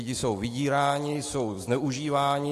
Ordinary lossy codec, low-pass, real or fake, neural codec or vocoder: AAC, 48 kbps; 14.4 kHz; fake; autoencoder, 48 kHz, 128 numbers a frame, DAC-VAE, trained on Japanese speech